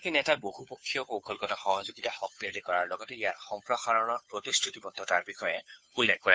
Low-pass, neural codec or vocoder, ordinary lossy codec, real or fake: none; codec, 16 kHz, 2 kbps, FunCodec, trained on Chinese and English, 25 frames a second; none; fake